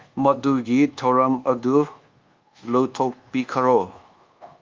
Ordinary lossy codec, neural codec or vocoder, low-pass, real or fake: Opus, 32 kbps; codec, 24 kHz, 1.2 kbps, DualCodec; 7.2 kHz; fake